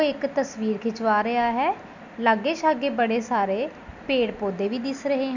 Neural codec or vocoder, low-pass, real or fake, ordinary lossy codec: none; 7.2 kHz; real; none